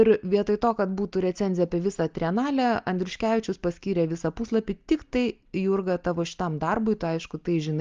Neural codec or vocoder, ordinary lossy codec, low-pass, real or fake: none; Opus, 32 kbps; 7.2 kHz; real